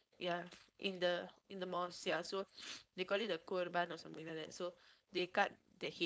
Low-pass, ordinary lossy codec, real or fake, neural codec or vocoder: none; none; fake; codec, 16 kHz, 4.8 kbps, FACodec